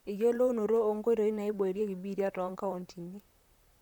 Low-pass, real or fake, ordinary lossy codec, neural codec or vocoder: 19.8 kHz; fake; none; vocoder, 44.1 kHz, 128 mel bands, Pupu-Vocoder